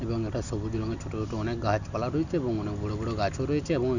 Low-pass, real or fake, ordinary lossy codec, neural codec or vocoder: 7.2 kHz; real; none; none